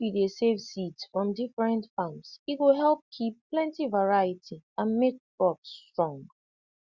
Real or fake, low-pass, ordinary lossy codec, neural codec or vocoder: real; 7.2 kHz; Opus, 64 kbps; none